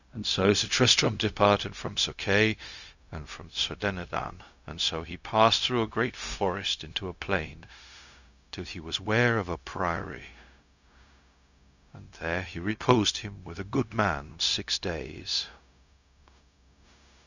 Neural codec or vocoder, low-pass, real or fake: codec, 16 kHz, 0.4 kbps, LongCat-Audio-Codec; 7.2 kHz; fake